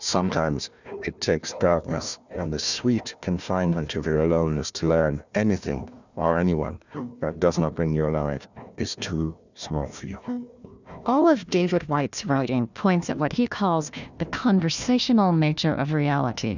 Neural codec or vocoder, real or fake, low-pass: codec, 16 kHz, 1 kbps, FunCodec, trained on Chinese and English, 50 frames a second; fake; 7.2 kHz